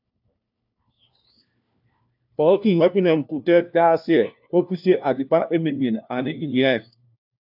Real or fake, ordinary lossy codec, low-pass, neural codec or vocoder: fake; AAC, 48 kbps; 5.4 kHz; codec, 16 kHz, 1 kbps, FunCodec, trained on LibriTTS, 50 frames a second